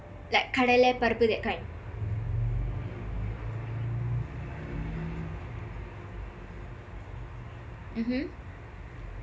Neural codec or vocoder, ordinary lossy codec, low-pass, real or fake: none; none; none; real